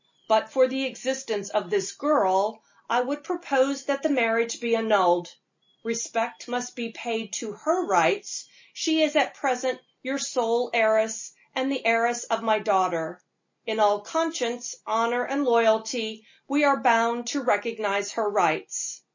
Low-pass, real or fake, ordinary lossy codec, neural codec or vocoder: 7.2 kHz; real; MP3, 32 kbps; none